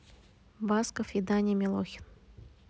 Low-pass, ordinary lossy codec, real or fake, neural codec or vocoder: none; none; real; none